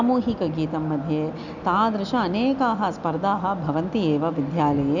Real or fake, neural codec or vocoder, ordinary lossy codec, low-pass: real; none; none; 7.2 kHz